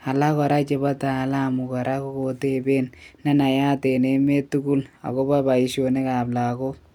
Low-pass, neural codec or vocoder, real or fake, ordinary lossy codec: 19.8 kHz; none; real; none